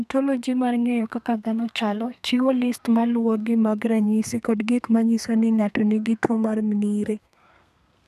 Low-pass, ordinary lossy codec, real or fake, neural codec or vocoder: 14.4 kHz; none; fake; codec, 32 kHz, 1.9 kbps, SNAC